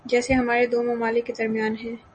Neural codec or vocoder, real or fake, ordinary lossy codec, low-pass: none; real; MP3, 32 kbps; 10.8 kHz